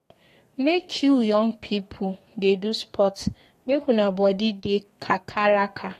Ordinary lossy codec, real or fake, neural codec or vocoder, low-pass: AAC, 48 kbps; fake; codec, 32 kHz, 1.9 kbps, SNAC; 14.4 kHz